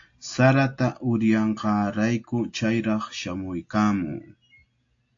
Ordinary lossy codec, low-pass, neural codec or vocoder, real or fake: AAC, 48 kbps; 7.2 kHz; none; real